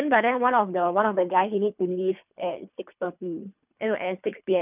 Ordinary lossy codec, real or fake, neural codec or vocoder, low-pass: none; fake; codec, 24 kHz, 3 kbps, HILCodec; 3.6 kHz